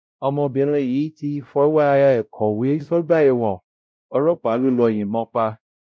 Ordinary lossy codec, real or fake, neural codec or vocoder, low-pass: none; fake; codec, 16 kHz, 0.5 kbps, X-Codec, WavLM features, trained on Multilingual LibriSpeech; none